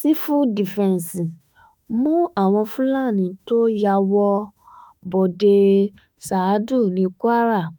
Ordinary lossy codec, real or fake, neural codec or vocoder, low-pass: none; fake; autoencoder, 48 kHz, 32 numbers a frame, DAC-VAE, trained on Japanese speech; none